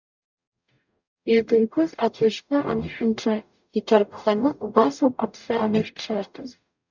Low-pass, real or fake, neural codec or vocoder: 7.2 kHz; fake; codec, 44.1 kHz, 0.9 kbps, DAC